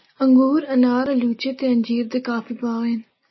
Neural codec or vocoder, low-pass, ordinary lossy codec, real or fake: none; 7.2 kHz; MP3, 24 kbps; real